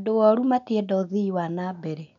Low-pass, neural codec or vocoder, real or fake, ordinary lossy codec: 7.2 kHz; none; real; none